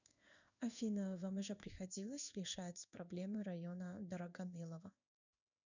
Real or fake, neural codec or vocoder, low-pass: fake; codec, 16 kHz in and 24 kHz out, 1 kbps, XY-Tokenizer; 7.2 kHz